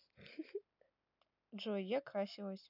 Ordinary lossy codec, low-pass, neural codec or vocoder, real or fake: none; 5.4 kHz; none; real